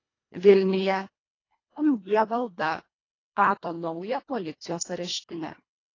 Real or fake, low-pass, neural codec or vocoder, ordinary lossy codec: fake; 7.2 kHz; codec, 24 kHz, 1.5 kbps, HILCodec; AAC, 32 kbps